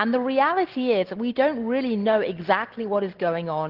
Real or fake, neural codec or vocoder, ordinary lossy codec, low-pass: real; none; Opus, 16 kbps; 5.4 kHz